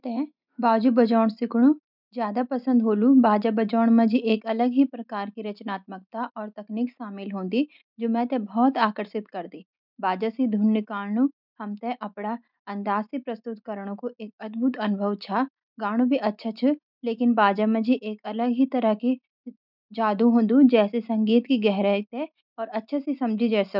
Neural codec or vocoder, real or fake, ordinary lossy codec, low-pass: none; real; none; 5.4 kHz